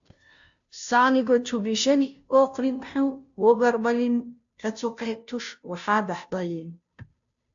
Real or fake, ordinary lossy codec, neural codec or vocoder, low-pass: fake; AAC, 64 kbps; codec, 16 kHz, 0.5 kbps, FunCodec, trained on Chinese and English, 25 frames a second; 7.2 kHz